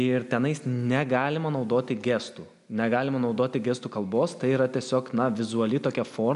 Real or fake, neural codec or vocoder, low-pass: real; none; 10.8 kHz